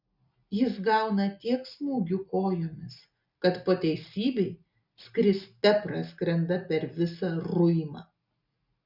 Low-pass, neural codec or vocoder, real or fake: 5.4 kHz; none; real